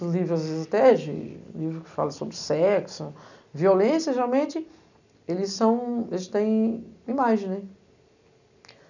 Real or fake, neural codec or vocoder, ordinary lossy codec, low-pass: real; none; none; 7.2 kHz